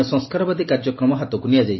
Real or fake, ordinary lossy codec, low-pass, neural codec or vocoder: real; MP3, 24 kbps; 7.2 kHz; none